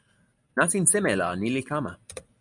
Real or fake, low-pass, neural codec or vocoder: real; 10.8 kHz; none